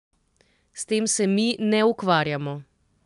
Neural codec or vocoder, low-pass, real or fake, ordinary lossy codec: none; 10.8 kHz; real; MP3, 96 kbps